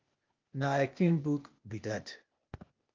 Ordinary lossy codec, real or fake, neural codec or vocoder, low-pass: Opus, 32 kbps; fake; codec, 16 kHz, 0.8 kbps, ZipCodec; 7.2 kHz